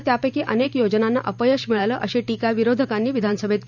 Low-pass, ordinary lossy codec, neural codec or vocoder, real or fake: 7.2 kHz; none; vocoder, 44.1 kHz, 128 mel bands every 512 samples, BigVGAN v2; fake